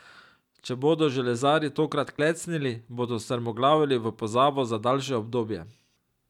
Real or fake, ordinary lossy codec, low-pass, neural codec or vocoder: real; none; 19.8 kHz; none